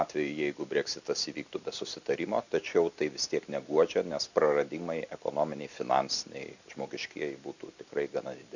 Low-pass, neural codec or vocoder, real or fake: 7.2 kHz; none; real